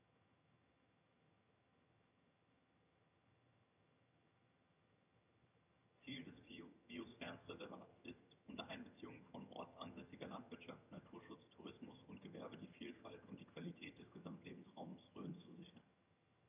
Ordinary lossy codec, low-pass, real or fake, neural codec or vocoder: none; 3.6 kHz; fake; vocoder, 22.05 kHz, 80 mel bands, HiFi-GAN